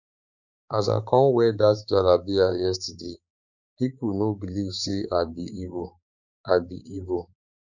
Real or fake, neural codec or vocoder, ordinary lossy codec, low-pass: fake; codec, 16 kHz, 4 kbps, X-Codec, HuBERT features, trained on balanced general audio; none; 7.2 kHz